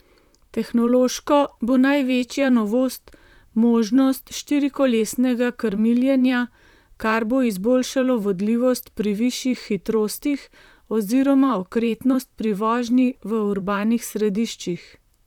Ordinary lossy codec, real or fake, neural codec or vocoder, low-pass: none; fake; vocoder, 44.1 kHz, 128 mel bands, Pupu-Vocoder; 19.8 kHz